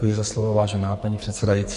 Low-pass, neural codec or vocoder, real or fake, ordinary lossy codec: 14.4 kHz; codec, 44.1 kHz, 2.6 kbps, SNAC; fake; MP3, 48 kbps